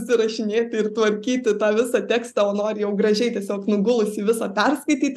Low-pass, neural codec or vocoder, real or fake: 14.4 kHz; none; real